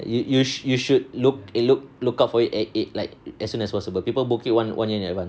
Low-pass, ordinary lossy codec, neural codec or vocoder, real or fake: none; none; none; real